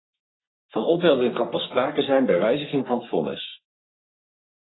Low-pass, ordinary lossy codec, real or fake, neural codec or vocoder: 7.2 kHz; AAC, 16 kbps; fake; codec, 44.1 kHz, 3.4 kbps, Pupu-Codec